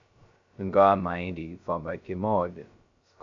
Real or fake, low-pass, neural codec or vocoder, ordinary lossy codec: fake; 7.2 kHz; codec, 16 kHz, 0.2 kbps, FocalCodec; AAC, 64 kbps